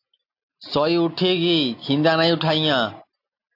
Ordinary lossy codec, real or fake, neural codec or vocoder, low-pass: AAC, 32 kbps; real; none; 5.4 kHz